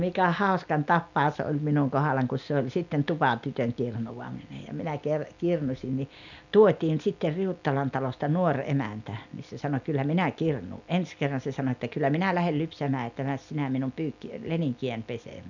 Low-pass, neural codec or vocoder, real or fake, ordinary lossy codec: 7.2 kHz; none; real; none